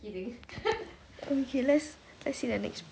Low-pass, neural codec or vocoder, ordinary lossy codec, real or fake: none; none; none; real